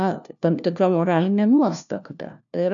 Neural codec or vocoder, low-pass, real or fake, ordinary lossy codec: codec, 16 kHz, 1 kbps, FunCodec, trained on LibriTTS, 50 frames a second; 7.2 kHz; fake; MP3, 96 kbps